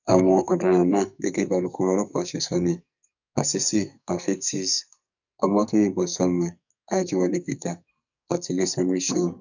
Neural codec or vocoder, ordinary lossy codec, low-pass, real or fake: codec, 44.1 kHz, 2.6 kbps, SNAC; none; 7.2 kHz; fake